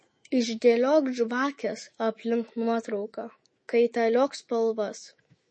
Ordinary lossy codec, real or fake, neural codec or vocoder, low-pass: MP3, 32 kbps; fake; autoencoder, 48 kHz, 128 numbers a frame, DAC-VAE, trained on Japanese speech; 9.9 kHz